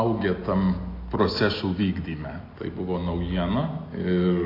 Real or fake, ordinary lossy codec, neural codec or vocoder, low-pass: real; AAC, 32 kbps; none; 5.4 kHz